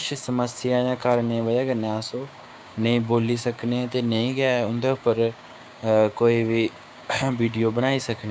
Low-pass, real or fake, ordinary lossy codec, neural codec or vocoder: none; fake; none; codec, 16 kHz, 6 kbps, DAC